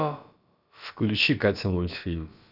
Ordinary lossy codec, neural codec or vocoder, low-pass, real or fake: none; codec, 16 kHz, about 1 kbps, DyCAST, with the encoder's durations; 5.4 kHz; fake